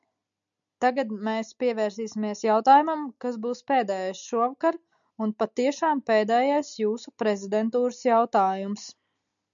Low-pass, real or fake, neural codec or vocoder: 7.2 kHz; real; none